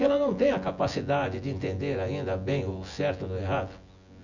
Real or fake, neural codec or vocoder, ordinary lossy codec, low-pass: fake; vocoder, 24 kHz, 100 mel bands, Vocos; none; 7.2 kHz